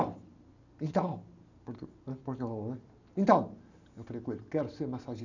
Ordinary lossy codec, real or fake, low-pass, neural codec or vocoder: none; real; 7.2 kHz; none